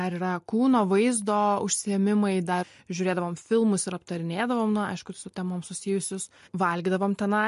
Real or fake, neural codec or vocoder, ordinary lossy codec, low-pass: real; none; MP3, 48 kbps; 14.4 kHz